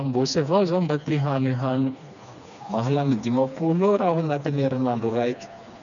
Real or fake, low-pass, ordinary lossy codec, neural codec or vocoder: fake; 7.2 kHz; none; codec, 16 kHz, 2 kbps, FreqCodec, smaller model